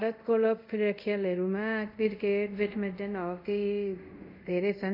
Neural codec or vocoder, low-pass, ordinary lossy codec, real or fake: codec, 24 kHz, 0.5 kbps, DualCodec; 5.4 kHz; none; fake